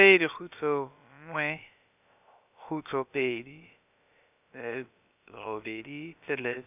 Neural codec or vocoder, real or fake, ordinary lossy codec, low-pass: codec, 16 kHz, about 1 kbps, DyCAST, with the encoder's durations; fake; none; 3.6 kHz